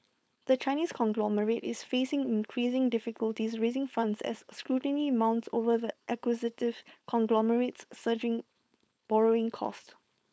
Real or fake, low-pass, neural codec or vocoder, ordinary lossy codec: fake; none; codec, 16 kHz, 4.8 kbps, FACodec; none